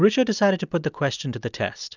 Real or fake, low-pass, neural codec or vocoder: real; 7.2 kHz; none